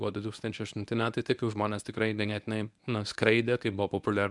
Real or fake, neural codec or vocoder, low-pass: fake; codec, 24 kHz, 0.9 kbps, WavTokenizer, medium speech release version 1; 10.8 kHz